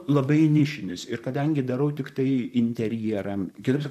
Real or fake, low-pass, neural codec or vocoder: fake; 14.4 kHz; vocoder, 44.1 kHz, 128 mel bands, Pupu-Vocoder